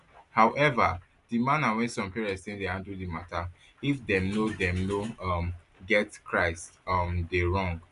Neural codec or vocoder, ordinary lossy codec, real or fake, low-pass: none; none; real; 10.8 kHz